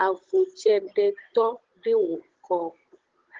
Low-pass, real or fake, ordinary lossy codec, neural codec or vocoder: 7.2 kHz; fake; Opus, 16 kbps; codec, 16 kHz, 16 kbps, FunCodec, trained on Chinese and English, 50 frames a second